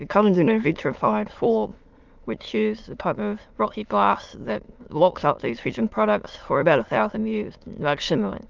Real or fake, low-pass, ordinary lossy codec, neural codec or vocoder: fake; 7.2 kHz; Opus, 24 kbps; autoencoder, 22.05 kHz, a latent of 192 numbers a frame, VITS, trained on many speakers